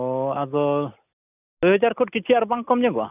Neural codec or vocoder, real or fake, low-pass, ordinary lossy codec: none; real; 3.6 kHz; none